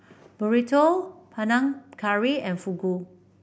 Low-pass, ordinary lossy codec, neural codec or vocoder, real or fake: none; none; none; real